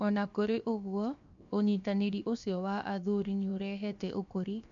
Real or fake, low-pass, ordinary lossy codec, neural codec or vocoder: fake; 7.2 kHz; MP3, 64 kbps; codec, 16 kHz, 0.7 kbps, FocalCodec